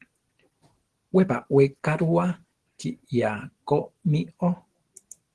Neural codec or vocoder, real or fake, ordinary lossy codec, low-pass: none; real; Opus, 16 kbps; 10.8 kHz